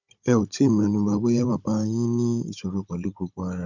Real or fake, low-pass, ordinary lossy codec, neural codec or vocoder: fake; 7.2 kHz; none; codec, 16 kHz, 16 kbps, FunCodec, trained on Chinese and English, 50 frames a second